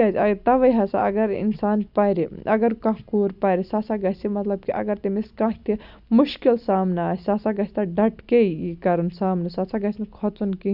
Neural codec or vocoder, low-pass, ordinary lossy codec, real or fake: none; 5.4 kHz; none; real